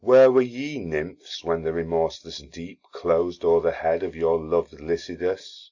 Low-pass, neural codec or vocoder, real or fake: 7.2 kHz; none; real